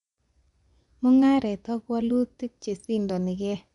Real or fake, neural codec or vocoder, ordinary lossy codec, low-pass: fake; vocoder, 24 kHz, 100 mel bands, Vocos; none; 10.8 kHz